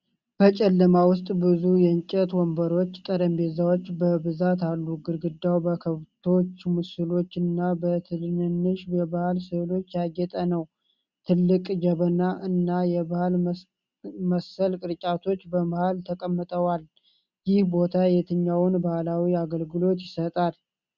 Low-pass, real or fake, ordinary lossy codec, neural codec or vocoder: 7.2 kHz; real; Opus, 64 kbps; none